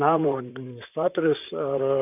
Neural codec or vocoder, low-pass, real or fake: vocoder, 44.1 kHz, 128 mel bands, Pupu-Vocoder; 3.6 kHz; fake